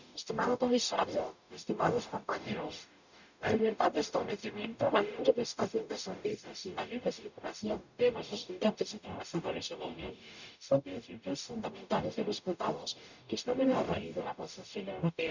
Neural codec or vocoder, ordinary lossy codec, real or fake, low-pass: codec, 44.1 kHz, 0.9 kbps, DAC; none; fake; 7.2 kHz